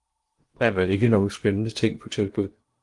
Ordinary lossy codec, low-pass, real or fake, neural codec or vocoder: Opus, 24 kbps; 10.8 kHz; fake; codec, 16 kHz in and 24 kHz out, 0.8 kbps, FocalCodec, streaming, 65536 codes